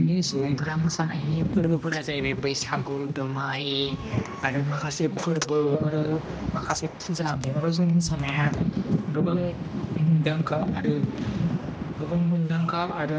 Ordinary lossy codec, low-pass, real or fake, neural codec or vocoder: none; none; fake; codec, 16 kHz, 1 kbps, X-Codec, HuBERT features, trained on general audio